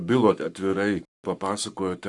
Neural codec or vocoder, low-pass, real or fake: codec, 44.1 kHz, 7.8 kbps, Pupu-Codec; 10.8 kHz; fake